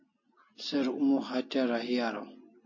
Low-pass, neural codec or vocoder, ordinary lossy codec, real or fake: 7.2 kHz; none; MP3, 32 kbps; real